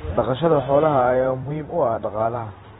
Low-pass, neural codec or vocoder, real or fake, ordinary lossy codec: 19.8 kHz; vocoder, 44.1 kHz, 128 mel bands every 512 samples, BigVGAN v2; fake; AAC, 16 kbps